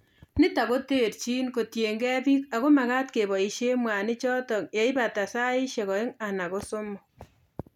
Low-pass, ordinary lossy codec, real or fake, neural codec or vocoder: 19.8 kHz; none; real; none